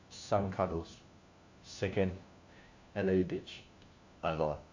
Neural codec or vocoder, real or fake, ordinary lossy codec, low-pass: codec, 16 kHz, 1 kbps, FunCodec, trained on LibriTTS, 50 frames a second; fake; AAC, 48 kbps; 7.2 kHz